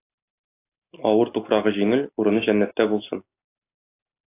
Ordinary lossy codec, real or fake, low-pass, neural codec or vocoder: AAC, 24 kbps; real; 3.6 kHz; none